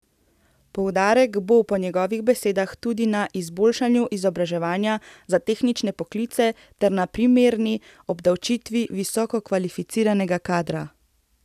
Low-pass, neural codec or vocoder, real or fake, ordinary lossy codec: 14.4 kHz; none; real; none